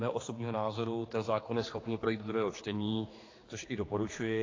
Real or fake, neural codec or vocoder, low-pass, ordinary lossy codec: fake; codec, 16 kHz, 4 kbps, X-Codec, HuBERT features, trained on general audio; 7.2 kHz; AAC, 32 kbps